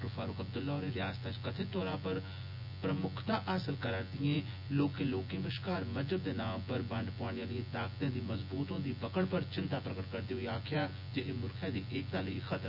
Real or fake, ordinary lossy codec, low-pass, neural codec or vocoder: fake; none; 5.4 kHz; vocoder, 24 kHz, 100 mel bands, Vocos